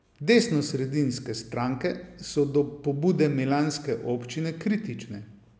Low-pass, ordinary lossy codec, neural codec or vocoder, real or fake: none; none; none; real